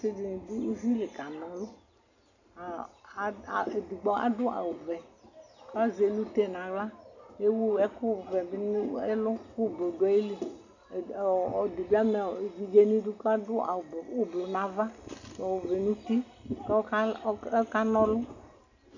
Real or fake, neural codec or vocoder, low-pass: real; none; 7.2 kHz